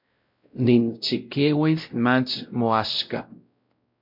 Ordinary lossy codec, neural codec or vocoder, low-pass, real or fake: MP3, 48 kbps; codec, 16 kHz, 0.5 kbps, X-Codec, WavLM features, trained on Multilingual LibriSpeech; 5.4 kHz; fake